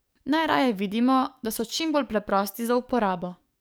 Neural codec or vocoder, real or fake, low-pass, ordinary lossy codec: codec, 44.1 kHz, 7.8 kbps, DAC; fake; none; none